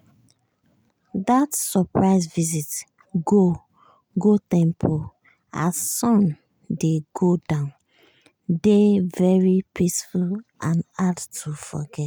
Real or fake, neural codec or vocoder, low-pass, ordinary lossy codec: real; none; none; none